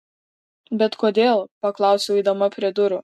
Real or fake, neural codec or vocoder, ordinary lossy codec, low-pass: real; none; MP3, 64 kbps; 14.4 kHz